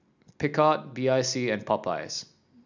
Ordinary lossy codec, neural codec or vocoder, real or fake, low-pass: none; none; real; 7.2 kHz